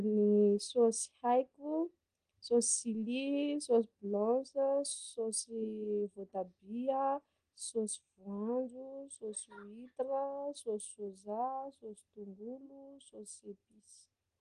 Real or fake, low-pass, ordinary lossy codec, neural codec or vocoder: real; 9.9 kHz; Opus, 24 kbps; none